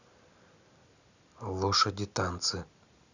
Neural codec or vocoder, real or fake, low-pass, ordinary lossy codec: none; real; 7.2 kHz; none